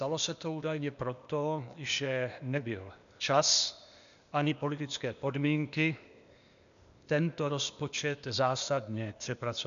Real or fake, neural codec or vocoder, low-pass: fake; codec, 16 kHz, 0.8 kbps, ZipCodec; 7.2 kHz